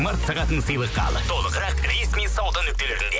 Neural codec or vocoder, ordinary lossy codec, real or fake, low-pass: none; none; real; none